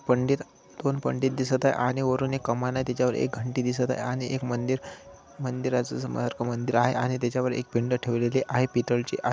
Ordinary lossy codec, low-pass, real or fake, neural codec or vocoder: none; none; real; none